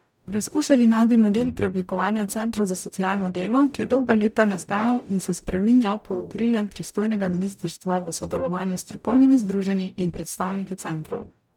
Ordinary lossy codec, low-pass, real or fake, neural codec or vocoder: none; 19.8 kHz; fake; codec, 44.1 kHz, 0.9 kbps, DAC